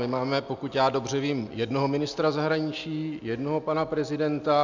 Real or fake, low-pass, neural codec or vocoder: real; 7.2 kHz; none